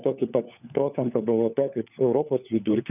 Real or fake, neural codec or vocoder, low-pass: fake; codec, 16 kHz, 4 kbps, FunCodec, trained on LibriTTS, 50 frames a second; 3.6 kHz